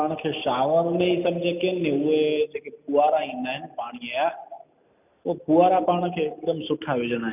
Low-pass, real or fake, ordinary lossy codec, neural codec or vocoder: 3.6 kHz; real; none; none